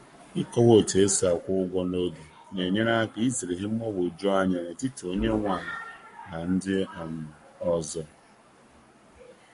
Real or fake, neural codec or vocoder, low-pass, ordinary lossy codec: fake; codec, 44.1 kHz, 7.8 kbps, Pupu-Codec; 14.4 kHz; MP3, 48 kbps